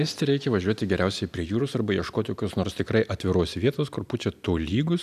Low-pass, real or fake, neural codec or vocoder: 14.4 kHz; real; none